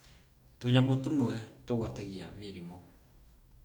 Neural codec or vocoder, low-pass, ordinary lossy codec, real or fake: codec, 44.1 kHz, 2.6 kbps, DAC; 19.8 kHz; none; fake